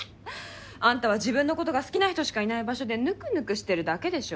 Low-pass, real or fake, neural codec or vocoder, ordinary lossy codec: none; real; none; none